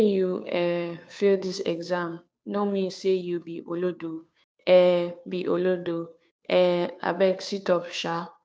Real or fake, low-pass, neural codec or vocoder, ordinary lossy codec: fake; none; codec, 16 kHz, 2 kbps, FunCodec, trained on Chinese and English, 25 frames a second; none